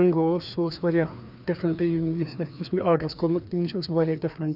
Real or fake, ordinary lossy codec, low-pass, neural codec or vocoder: fake; none; 5.4 kHz; codec, 16 kHz, 2 kbps, FreqCodec, larger model